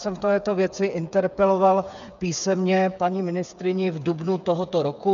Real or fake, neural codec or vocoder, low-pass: fake; codec, 16 kHz, 8 kbps, FreqCodec, smaller model; 7.2 kHz